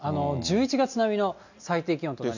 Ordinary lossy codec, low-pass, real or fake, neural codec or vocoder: none; 7.2 kHz; real; none